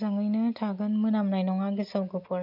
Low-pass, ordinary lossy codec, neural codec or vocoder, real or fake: 5.4 kHz; none; none; real